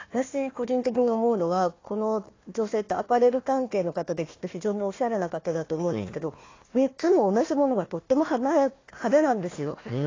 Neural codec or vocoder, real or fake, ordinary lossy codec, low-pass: codec, 16 kHz, 1 kbps, FunCodec, trained on Chinese and English, 50 frames a second; fake; AAC, 32 kbps; 7.2 kHz